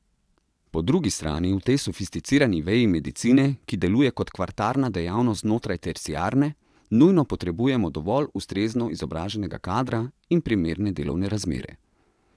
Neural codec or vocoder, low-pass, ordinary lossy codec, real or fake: vocoder, 22.05 kHz, 80 mel bands, WaveNeXt; none; none; fake